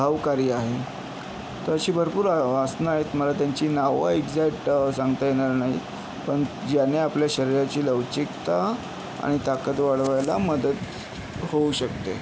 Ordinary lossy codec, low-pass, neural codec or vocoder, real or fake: none; none; none; real